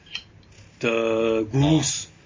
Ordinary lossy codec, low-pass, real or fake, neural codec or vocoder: MP3, 32 kbps; 7.2 kHz; real; none